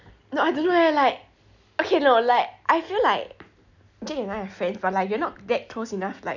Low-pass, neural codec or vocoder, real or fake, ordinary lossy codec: 7.2 kHz; none; real; none